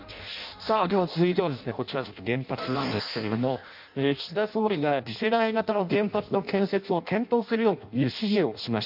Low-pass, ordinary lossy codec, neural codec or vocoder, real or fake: 5.4 kHz; MP3, 48 kbps; codec, 16 kHz in and 24 kHz out, 0.6 kbps, FireRedTTS-2 codec; fake